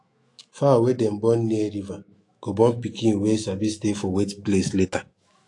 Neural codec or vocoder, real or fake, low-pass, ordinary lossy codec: autoencoder, 48 kHz, 128 numbers a frame, DAC-VAE, trained on Japanese speech; fake; 10.8 kHz; AAC, 48 kbps